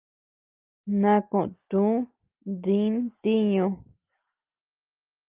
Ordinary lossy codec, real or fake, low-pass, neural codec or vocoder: Opus, 32 kbps; real; 3.6 kHz; none